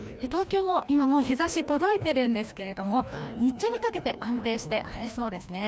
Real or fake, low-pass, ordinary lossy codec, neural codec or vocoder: fake; none; none; codec, 16 kHz, 1 kbps, FreqCodec, larger model